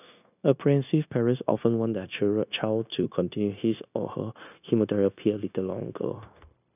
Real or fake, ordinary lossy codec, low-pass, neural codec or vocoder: fake; none; 3.6 kHz; codec, 16 kHz, 0.9 kbps, LongCat-Audio-Codec